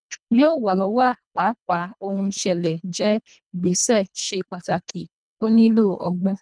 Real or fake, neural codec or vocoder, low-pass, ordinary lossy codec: fake; codec, 24 kHz, 1.5 kbps, HILCodec; 9.9 kHz; none